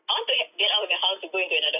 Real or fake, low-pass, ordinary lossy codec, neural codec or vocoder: real; 3.6 kHz; none; none